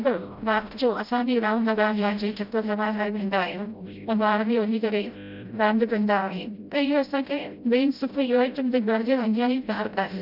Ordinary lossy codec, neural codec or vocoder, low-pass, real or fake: none; codec, 16 kHz, 0.5 kbps, FreqCodec, smaller model; 5.4 kHz; fake